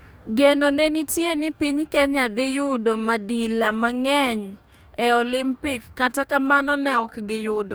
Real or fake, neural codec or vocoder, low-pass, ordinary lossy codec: fake; codec, 44.1 kHz, 2.6 kbps, DAC; none; none